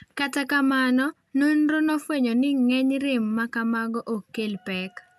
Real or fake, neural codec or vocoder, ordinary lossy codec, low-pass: real; none; none; 14.4 kHz